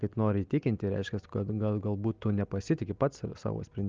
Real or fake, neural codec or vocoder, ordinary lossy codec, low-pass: real; none; Opus, 24 kbps; 7.2 kHz